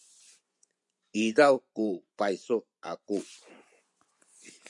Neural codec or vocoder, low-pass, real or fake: vocoder, 24 kHz, 100 mel bands, Vocos; 10.8 kHz; fake